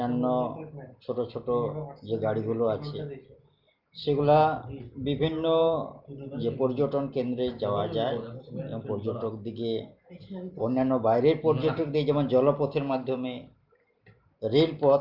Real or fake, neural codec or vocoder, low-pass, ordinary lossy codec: real; none; 5.4 kHz; Opus, 32 kbps